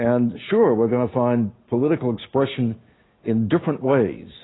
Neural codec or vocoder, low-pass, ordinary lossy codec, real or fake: none; 7.2 kHz; AAC, 16 kbps; real